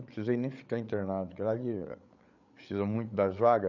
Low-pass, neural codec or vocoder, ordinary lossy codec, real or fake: 7.2 kHz; codec, 16 kHz, 8 kbps, FreqCodec, larger model; none; fake